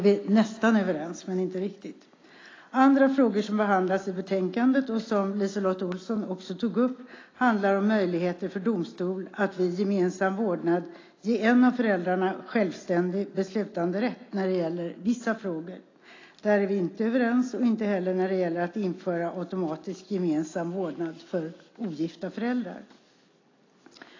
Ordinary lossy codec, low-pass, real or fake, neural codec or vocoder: AAC, 32 kbps; 7.2 kHz; real; none